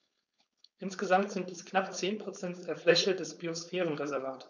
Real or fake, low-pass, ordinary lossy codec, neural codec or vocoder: fake; 7.2 kHz; none; codec, 16 kHz, 4.8 kbps, FACodec